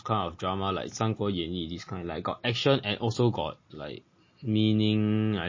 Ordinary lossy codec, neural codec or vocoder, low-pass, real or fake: MP3, 32 kbps; none; 7.2 kHz; real